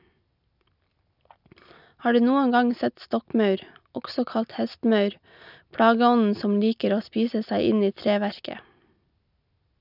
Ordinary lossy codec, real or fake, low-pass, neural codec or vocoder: none; real; 5.4 kHz; none